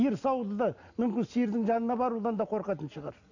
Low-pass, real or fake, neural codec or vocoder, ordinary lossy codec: 7.2 kHz; real; none; none